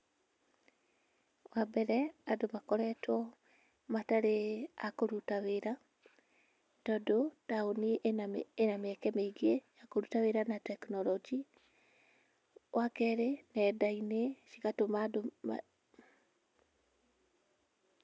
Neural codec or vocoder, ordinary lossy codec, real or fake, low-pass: none; none; real; none